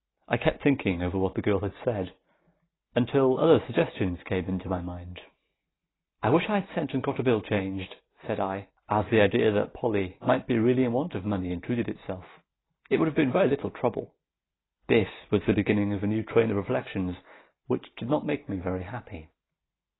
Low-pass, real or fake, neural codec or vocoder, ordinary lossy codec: 7.2 kHz; real; none; AAC, 16 kbps